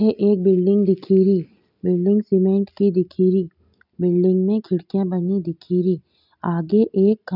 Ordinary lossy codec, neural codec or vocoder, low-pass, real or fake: none; none; 5.4 kHz; real